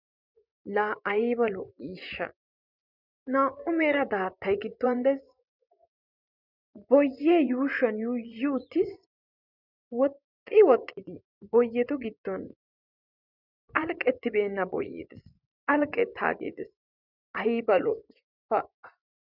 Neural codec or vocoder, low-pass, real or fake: vocoder, 24 kHz, 100 mel bands, Vocos; 5.4 kHz; fake